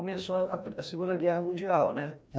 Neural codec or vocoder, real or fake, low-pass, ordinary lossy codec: codec, 16 kHz, 1 kbps, FreqCodec, larger model; fake; none; none